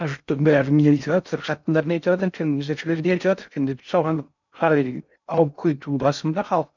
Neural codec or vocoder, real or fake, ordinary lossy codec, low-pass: codec, 16 kHz in and 24 kHz out, 0.6 kbps, FocalCodec, streaming, 2048 codes; fake; none; 7.2 kHz